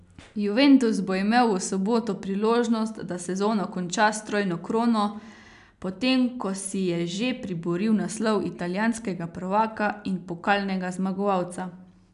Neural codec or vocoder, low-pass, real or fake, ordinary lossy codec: none; 10.8 kHz; real; none